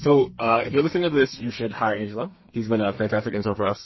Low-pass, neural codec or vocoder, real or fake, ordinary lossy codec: 7.2 kHz; codec, 32 kHz, 1.9 kbps, SNAC; fake; MP3, 24 kbps